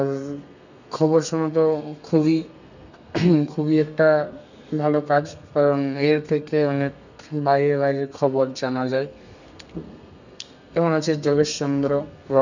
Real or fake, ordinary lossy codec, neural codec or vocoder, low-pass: fake; none; codec, 32 kHz, 1.9 kbps, SNAC; 7.2 kHz